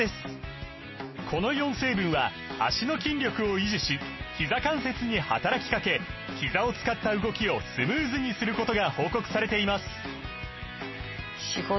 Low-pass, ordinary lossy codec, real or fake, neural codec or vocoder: 7.2 kHz; MP3, 24 kbps; real; none